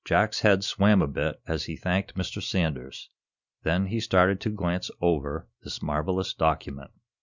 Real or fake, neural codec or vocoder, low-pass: real; none; 7.2 kHz